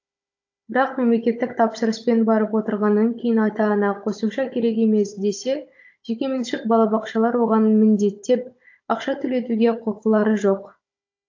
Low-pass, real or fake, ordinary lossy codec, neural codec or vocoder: 7.2 kHz; fake; AAC, 48 kbps; codec, 16 kHz, 16 kbps, FunCodec, trained on Chinese and English, 50 frames a second